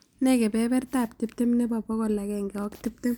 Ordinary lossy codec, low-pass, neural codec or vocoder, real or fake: none; none; none; real